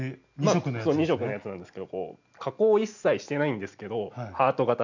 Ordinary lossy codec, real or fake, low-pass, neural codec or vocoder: none; real; 7.2 kHz; none